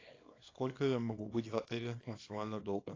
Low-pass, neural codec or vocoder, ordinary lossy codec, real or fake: 7.2 kHz; codec, 24 kHz, 0.9 kbps, WavTokenizer, small release; AAC, 32 kbps; fake